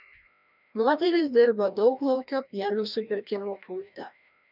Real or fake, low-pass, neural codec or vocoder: fake; 5.4 kHz; codec, 16 kHz, 1 kbps, FreqCodec, larger model